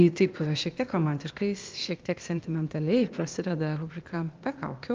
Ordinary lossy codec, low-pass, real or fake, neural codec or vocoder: Opus, 24 kbps; 7.2 kHz; fake; codec, 16 kHz, 0.8 kbps, ZipCodec